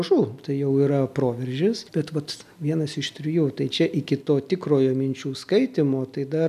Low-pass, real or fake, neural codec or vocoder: 14.4 kHz; real; none